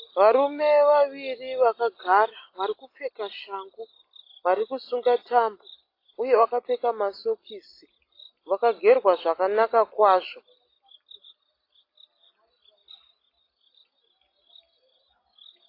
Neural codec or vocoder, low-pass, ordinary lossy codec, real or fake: none; 5.4 kHz; AAC, 32 kbps; real